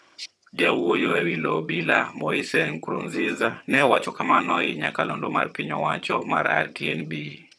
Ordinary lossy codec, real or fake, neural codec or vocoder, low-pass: none; fake; vocoder, 22.05 kHz, 80 mel bands, HiFi-GAN; none